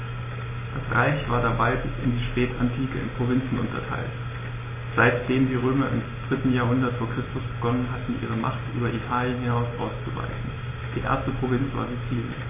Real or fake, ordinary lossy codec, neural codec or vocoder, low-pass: real; MP3, 16 kbps; none; 3.6 kHz